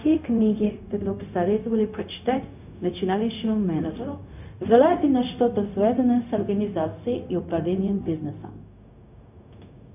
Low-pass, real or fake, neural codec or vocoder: 3.6 kHz; fake; codec, 16 kHz, 0.4 kbps, LongCat-Audio-Codec